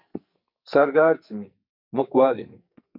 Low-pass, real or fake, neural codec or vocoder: 5.4 kHz; fake; codec, 44.1 kHz, 2.6 kbps, SNAC